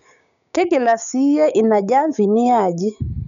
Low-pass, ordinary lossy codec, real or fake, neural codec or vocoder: 7.2 kHz; none; fake; codec, 16 kHz, 6 kbps, DAC